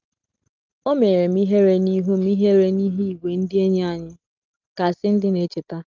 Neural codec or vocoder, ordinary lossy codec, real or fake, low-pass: none; Opus, 24 kbps; real; 7.2 kHz